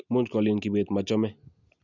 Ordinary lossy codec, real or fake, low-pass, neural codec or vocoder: none; real; 7.2 kHz; none